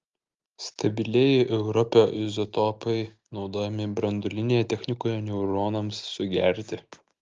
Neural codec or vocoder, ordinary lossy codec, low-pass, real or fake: none; Opus, 32 kbps; 7.2 kHz; real